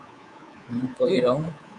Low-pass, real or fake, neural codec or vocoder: 10.8 kHz; fake; codec, 24 kHz, 3.1 kbps, DualCodec